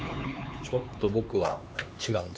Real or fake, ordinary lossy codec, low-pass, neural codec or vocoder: fake; none; none; codec, 16 kHz, 4 kbps, X-Codec, HuBERT features, trained on LibriSpeech